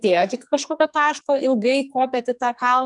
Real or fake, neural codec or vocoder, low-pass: fake; codec, 44.1 kHz, 2.6 kbps, SNAC; 10.8 kHz